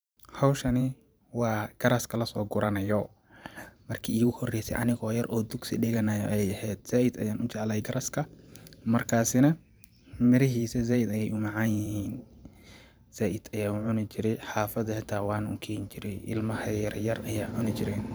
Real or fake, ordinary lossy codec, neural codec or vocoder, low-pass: fake; none; vocoder, 44.1 kHz, 128 mel bands every 512 samples, BigVGAN v2; none